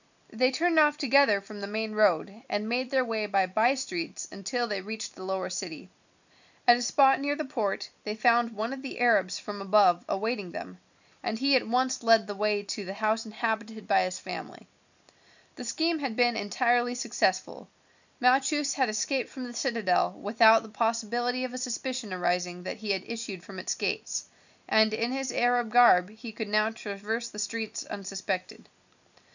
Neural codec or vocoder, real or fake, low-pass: none; real; 7.2 kHz